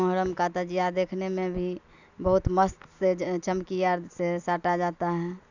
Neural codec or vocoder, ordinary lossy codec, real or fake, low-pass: none; none; real; 7.2 kHz